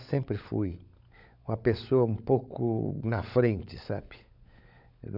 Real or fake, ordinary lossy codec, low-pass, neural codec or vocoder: fake; none; 5.4 kHz; codec, 16 kHz, 16 kbps, FunCodec, trained on LibriTTS, 50 frames a second